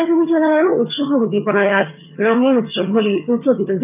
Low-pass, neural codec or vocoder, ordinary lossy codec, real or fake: 3.6 kHz; vocoder, 22.05 kHz, 80 mel bands, HiFi-GAN; none; fake